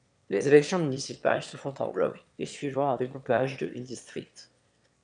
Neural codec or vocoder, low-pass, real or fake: autoencoder, 22.05 kHz, a latent of 192 numbers a frame, VITS, trained on one speaker; 9.9 kHz; fake